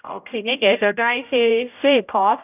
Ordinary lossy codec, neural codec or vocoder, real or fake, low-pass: none; codec, 16 kHz, 0.5 kbps, X-Codec, HuBERT features, trained on general audio; fake; 3.6 kHz